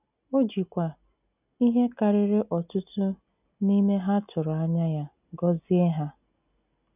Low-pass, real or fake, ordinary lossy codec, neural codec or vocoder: 3.6 kHz; real; none; none